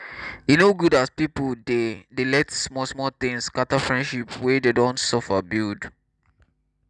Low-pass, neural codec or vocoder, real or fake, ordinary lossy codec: 10.8 kHz; none; real; none